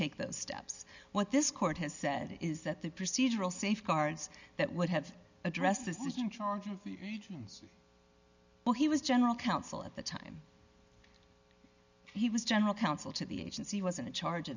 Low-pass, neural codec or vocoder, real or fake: 7.2 kHz; none; real